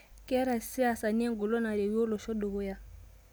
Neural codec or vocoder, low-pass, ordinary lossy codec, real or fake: none; none; none; real